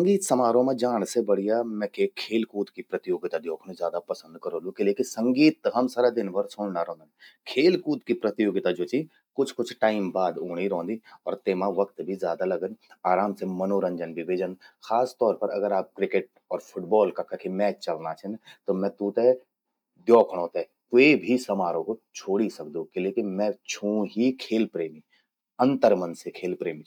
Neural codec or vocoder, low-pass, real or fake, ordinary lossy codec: none; 19.8 kHz; real; none